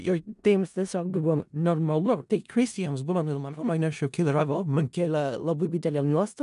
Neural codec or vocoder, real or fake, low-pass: codec, 16 kHz in and 24 kHz out, 0.4 kbps, LongCat-Audio-Codec, four codebook decoder; fake; 10.8 kHz